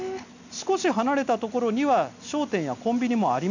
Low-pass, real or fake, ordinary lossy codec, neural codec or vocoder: 7.2 kHz; real; none; none